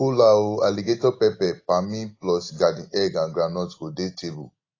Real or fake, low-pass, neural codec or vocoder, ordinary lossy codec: real; 7.2 kHz; none; AAC, 32 kbps